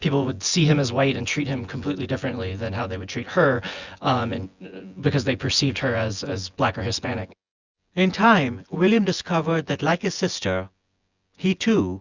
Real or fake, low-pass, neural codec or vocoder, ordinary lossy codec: fake; 7.2 kHz; vocoder, 24 kHz, 100 mel bands, Vocos; Opus, 64 kbps